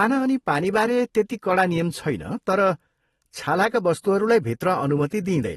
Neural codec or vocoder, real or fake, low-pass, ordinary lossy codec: vocoder, 44.1 kHz, 128 mel bands every 512 samples, BigVGAN v2; fake; 19.8 kHz; AAC, 32 kbps